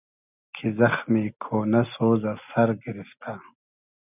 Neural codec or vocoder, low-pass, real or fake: none; 3.6 kHz; real